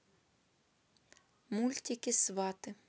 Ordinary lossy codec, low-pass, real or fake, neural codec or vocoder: none; none; real; none